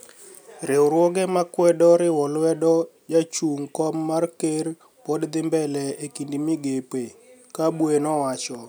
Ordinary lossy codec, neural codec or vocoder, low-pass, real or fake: none; none; none; real